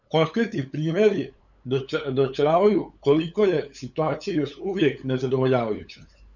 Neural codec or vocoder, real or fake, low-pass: codec, 16 kHz, 8 kbps, FunCodec, trained on LibriTTS, 25 frames a second; fake; 7.2 kHz